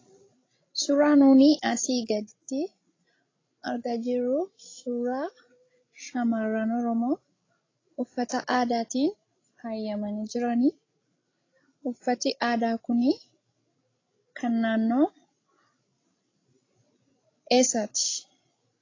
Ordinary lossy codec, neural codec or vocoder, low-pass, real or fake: AAC, 32 kbps; codec, 16 kHz, 16 kbps, FreqCodec, larger model; 7.2 kHz; fake